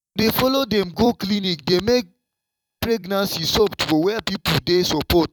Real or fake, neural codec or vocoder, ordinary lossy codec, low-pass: fake; vocoder, 44.1 kHz, 128 mel bands every 256 samples, BigVGAN v2; none; 19.8 kHz